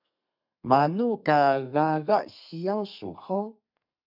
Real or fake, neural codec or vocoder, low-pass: fake; codec, 32 kHz, 1.9 kbps, SNAC; 5.4 kHz